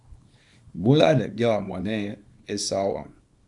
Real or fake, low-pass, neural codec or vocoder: fake; 10.8 kHz; codec, 24 kHz, 0.9 kbps, WavTokenizer, small release